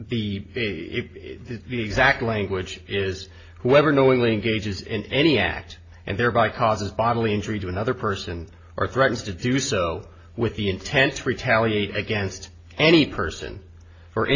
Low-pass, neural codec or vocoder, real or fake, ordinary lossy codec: 7.2 kHz; none; real; AAC, 32 kbps